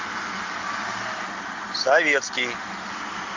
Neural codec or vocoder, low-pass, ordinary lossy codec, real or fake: none; 7.2 kHz; MP3, 48 kbps; real